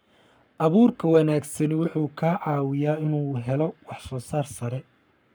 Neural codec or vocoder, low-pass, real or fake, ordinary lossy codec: codec, 44.1 kHz, 7.8 kbps, Pupu-Codec; none; fake; none